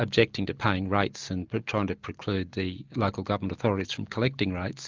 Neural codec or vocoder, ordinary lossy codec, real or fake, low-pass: none; Opus, 24 kbps; real; 7.2 kHz